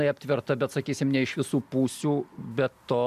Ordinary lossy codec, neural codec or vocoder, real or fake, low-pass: AAC, 96 kbps; none; real; 14.4 kHz